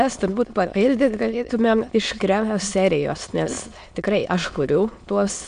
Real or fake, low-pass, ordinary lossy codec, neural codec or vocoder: fake; 9.9 kHz; MP3, 64 kbps; autoencoder, 22.05 kHz, a latent of 192 numbers a frame, VITS, trained on many speakers